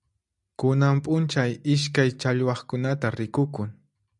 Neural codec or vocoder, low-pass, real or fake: none; 10.8 kHz; real